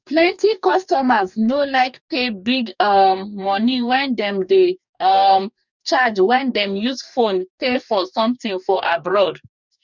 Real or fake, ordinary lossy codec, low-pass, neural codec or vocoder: fake; none; 7.2 kHz; codec, 44.1 kHz, 2.6 kbps, DAC